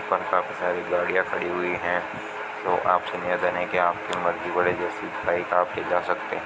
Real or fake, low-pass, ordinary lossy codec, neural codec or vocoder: real; none; none; none